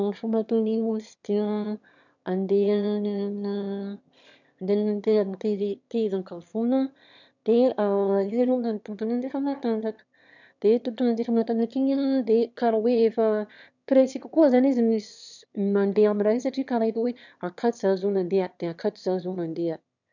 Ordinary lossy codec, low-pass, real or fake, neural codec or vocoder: none; 7.2 kHz; fake; autoencoder, 22.05 kHz, a latent of 192 numbers a frame, VITS, trained on one speaker